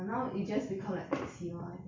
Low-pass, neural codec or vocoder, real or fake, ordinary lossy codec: 7.2 kHz; none; real; MP3, 64 kbps